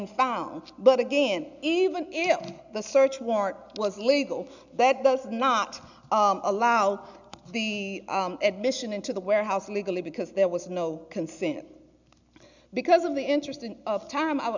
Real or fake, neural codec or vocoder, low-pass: real; none; 7.2 kHz